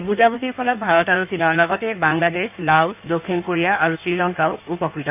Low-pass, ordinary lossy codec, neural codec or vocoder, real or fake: 3.6 kHz; none; codec, 16 kHz in and 24 kHz out, 1.1 kbps, FireRedTTS-2 codec; fake